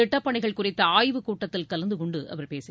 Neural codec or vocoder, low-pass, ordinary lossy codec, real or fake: none; none; none; real